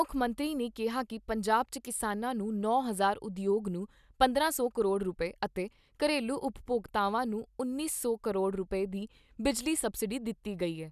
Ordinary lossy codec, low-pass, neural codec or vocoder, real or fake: none; 14.4 kHz; none; real